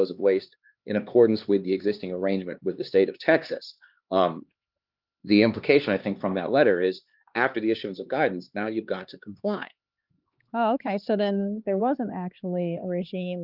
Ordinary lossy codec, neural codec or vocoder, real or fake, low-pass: Opus, 24 kbps; codec, 16 kHz, 2 kbps, X-Codec, HuBERT features, trained on LibriSpeech; fake; 5.4 kHz